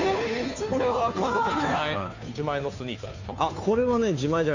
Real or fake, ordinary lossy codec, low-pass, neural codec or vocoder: fake; AAC, 48 kbps; 7.2 kHz; codec, 16 kHz, 2 kbps, FunCodec, trained on Chinese and English, 25 frames a second